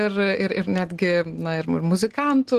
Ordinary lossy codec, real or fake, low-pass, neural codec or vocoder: Opus, 16 kbps; real; 14.4 kHz; none